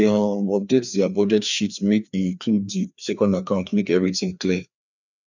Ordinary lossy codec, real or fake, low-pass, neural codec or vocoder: none; fake; 7.2 kHz; codec, 16 kHz, 2 kbps, FreqCodec, larger model